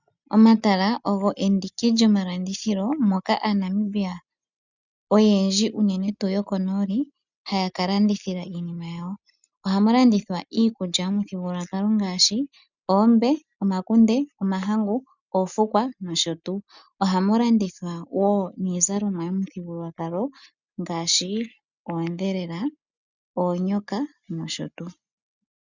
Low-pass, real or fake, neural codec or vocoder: 7.2 kHz; real; none